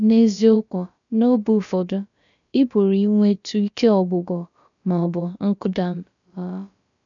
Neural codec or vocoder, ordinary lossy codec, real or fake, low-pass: codec, 16 kHz, about 1 kbps, DyCAST, with the encoder's durations; none; fake; 7.2 kHz